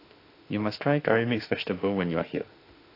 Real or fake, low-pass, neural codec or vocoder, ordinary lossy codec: fake; 5.4 kHz; autoencoder, 48 kHz, 32 numbers a frame, DAC-VAE, trained on Japanese speech; AAC, 32 kbps